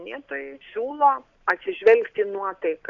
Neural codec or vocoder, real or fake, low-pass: none; real; 7.2 kHz